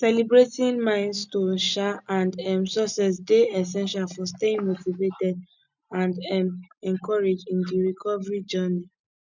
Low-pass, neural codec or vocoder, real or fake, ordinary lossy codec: 7.2 kHz; none; real; none